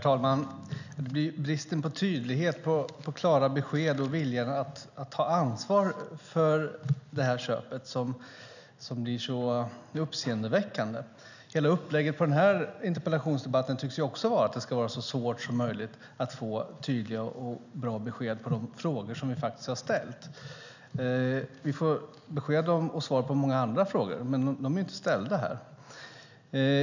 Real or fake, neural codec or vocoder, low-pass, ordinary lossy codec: real; none; 7.2 kHz; none